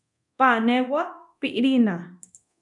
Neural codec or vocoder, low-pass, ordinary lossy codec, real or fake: codec, 24 kHz, 0.9 kbps, DualCodec; 10.8 kHz; MP3, 96 kbps; fake